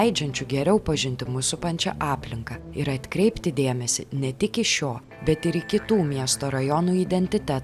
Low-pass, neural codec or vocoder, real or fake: 14.4 kHz; none; real